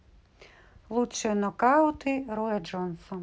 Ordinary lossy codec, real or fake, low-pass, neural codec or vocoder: none; real; none; none